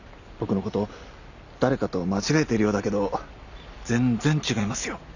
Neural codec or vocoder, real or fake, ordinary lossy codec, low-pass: none; real; none; 7.2 kHz